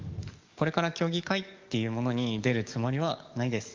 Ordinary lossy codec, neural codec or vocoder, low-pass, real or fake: Opus, 32 kbps; codec, 44.1 kHz, 7.8 kbps, DAC; 7.2 kHz; fake